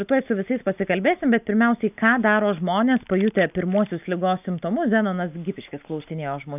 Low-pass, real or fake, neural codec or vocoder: 3.6 kHz; real; none